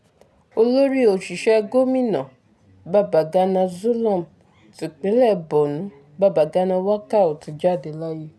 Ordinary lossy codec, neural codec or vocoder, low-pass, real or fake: none; none; none; real